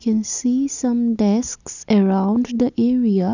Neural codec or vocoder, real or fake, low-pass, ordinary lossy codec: none; real; 7.2 kHz; none